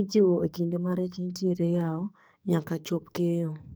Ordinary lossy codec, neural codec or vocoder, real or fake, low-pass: none; codec, 44.1 kHz, 2.6 kbps, SNAC; fake; none